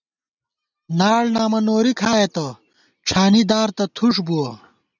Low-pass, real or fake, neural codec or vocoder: 7.2 kHz; real; none